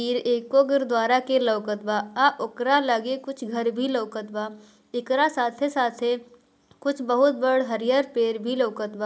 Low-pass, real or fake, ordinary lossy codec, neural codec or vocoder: none; real; none; none